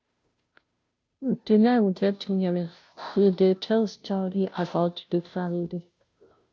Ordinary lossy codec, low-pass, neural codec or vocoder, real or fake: none; none; codec, 16 kHz, 0.5 kbps, FunCodec, trained on Chinese and English, 25 frames a second; fake